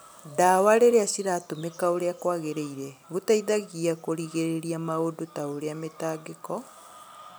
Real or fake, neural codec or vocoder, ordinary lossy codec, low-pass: real; none; none; none